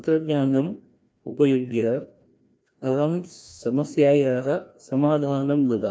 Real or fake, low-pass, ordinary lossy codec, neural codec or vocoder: fake; none; none; codec, 16 kHz, 1 kbps, FreqCodec, larger model